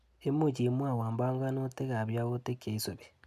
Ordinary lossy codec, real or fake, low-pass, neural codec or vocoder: none; real; 14.4 kHz; none